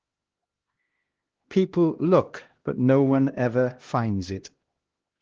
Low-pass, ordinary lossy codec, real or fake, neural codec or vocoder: 7.2 kHz; Opus, 16 kbps; fake; codec, 16 kHz, 1 kbps, X-Codec, HuBERT features, trained on LibriSpeech